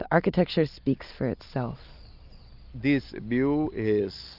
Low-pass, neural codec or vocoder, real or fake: 5.4 kHz; none; real